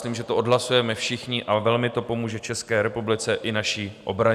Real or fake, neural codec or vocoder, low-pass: real; none; 14.4 kHz